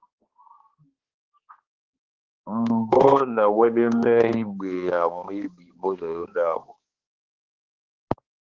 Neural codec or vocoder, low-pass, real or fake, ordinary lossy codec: codec, 16 kHz, 2 kbps, X-Codec, HuBERT features, trained on balanced general audio; 7.2 kHz; fake; Opus, 16 kbps